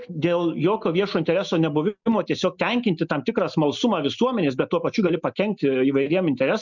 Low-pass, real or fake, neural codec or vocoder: 7.2 kHz; real; none